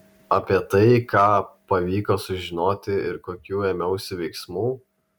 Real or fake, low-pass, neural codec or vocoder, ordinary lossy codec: real; 19.8 kHz; none; MP3, 96 kbps